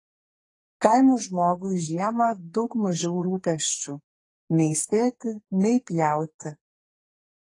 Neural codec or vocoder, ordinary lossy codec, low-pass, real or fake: codec, 44.1 kHz, 2.6 kbps, SNAC; AAC, 32 kbps; 10.8 kHz; fake